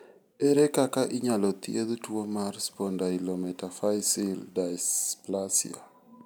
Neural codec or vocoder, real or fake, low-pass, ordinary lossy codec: none; real; none; none